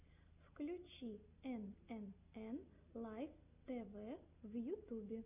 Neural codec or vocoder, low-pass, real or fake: none; 3.6 kHz; real